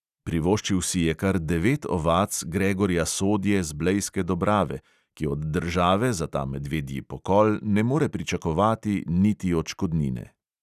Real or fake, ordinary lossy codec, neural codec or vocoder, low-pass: real; none; none; 14.4 kHz